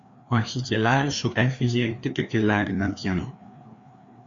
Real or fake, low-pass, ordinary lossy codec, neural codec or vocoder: fake; 7.2 kHz; Opus, 64 kbps; codec, 16 kHz, 2 kbps, FreqCodec, larger model